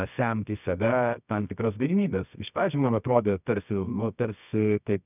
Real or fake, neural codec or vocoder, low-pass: fake; codec, 24 kHz, 0.9 kbps, WavTokenizer, medium music audio release; 3.6 kHz